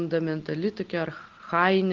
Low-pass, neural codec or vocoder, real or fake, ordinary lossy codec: 7.2 kHz; none; real; Opus, 16 kbps